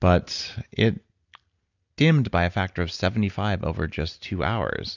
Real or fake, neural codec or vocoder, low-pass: real; none; 7.2 kHz